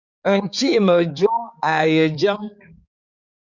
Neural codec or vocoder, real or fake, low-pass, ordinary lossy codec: codec, 16 kHz, 4 kbps, X-Codec, HuBERT features, trained on balanced general audio; fake; 7.2 kHz; Opus, 64 kbps